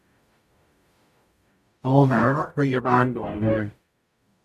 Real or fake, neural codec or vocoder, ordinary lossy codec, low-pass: fake; codec, 44.1 kHz, 0.9 kbps, DAC; none; 14.4 kHz